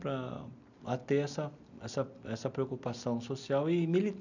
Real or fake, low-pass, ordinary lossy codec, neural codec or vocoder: real; 7.2 kHz; none; none